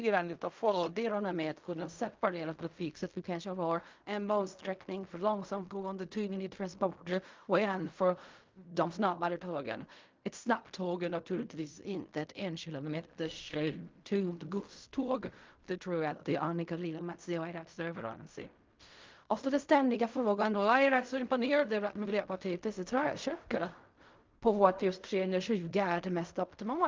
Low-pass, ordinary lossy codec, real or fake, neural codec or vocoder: 7.2 kHz; Opus, 24 kbps; fake; codec, 16 kHz in and 24 kHz out, 0.4 kbps, LongCat-Audio-Codec, fine tuned four codebook decoder